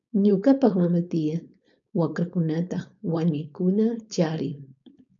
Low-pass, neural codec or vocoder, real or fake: 7.2 kHz; codec, 16 kHz, 4.8 kbps, FACodec; fake